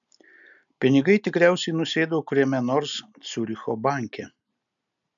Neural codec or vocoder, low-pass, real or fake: none; 7.2 kHz; real